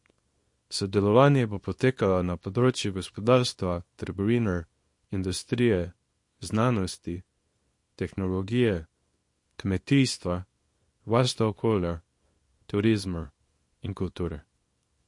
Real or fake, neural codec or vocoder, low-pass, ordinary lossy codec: fake; codec, 24 kHz, 0.9 kbps, WavTokenizer, small release; 10.8 kHz; MP3, 48 kbps